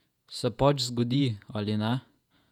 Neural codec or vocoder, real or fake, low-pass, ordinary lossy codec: vocoder, 48 kHz, 128 mel bands, Vocos; fake; 19.8 kHz; none